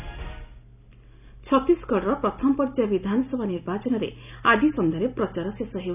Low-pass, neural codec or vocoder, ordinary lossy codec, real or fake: 3.6 kHz; none; none; real